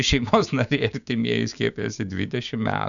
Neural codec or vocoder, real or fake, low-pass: codec, 16 kHz, 6 kbps, DAC; fake; 7.2 kHz